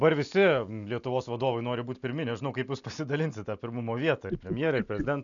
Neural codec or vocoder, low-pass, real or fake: none; 7.2 kHz; real